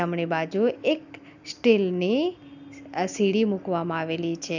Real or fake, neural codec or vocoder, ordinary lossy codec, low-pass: real; none; none; 7.2 kHz